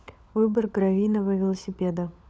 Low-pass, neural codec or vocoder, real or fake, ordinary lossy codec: none; codec, 16 kHz, 16 kbps, FunCodec, trained on LibriTTS, 50 frames a second; fake; none